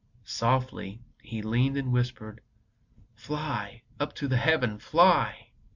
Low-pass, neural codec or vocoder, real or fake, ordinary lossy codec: 7.2 kHz; none; real; MP3, 64 kbps